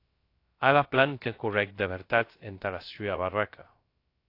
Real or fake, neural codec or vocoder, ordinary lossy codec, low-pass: fake; codec, 16 kHz, 0.3 kbps, FocalCodec; AAC, 32 kbps; 5.4 kHz